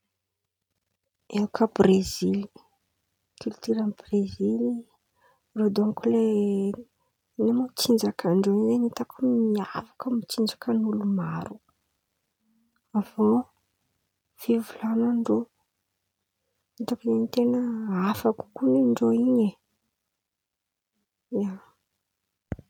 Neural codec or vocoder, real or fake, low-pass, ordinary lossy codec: none; real; 19.8 kHz; none